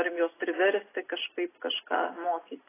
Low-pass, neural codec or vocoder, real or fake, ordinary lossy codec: 3.6 kHz; none; real; AAC, 16 kbps